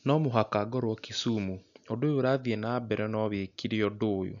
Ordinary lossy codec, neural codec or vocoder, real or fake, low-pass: none; none; real; 7.2 kHz